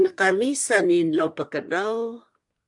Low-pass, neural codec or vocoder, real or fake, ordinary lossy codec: 10.8 kHz; codec, 24 kHz, 1 kbps, SNAC; fake; MP3, 64 kbps